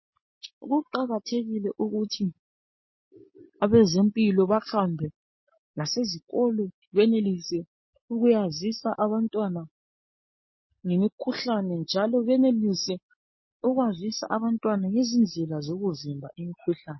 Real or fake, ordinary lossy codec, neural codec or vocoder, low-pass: real; MP3, 24 kbps; none; 7.2 kHz